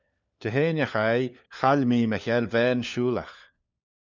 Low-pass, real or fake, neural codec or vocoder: 7.2 kHz; fake; codec, 16 kHz, 4 kbps, FunCodec, trained on LibriTTS, 50 frames a second